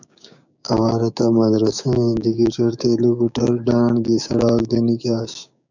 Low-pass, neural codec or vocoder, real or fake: 7.2 kHz; codec, 16 kHz, 6 kbps, DAC; fake